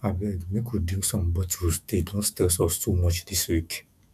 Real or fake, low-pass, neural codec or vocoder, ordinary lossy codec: fake; 14.4 kHz; autoencoder, 48 kHz, 128 numbers a frame, DAC-VAE, trained on Japanese speech; none